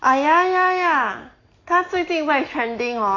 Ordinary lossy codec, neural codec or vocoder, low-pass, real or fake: AAC, 32 kbps; none; 7.2 kHz; real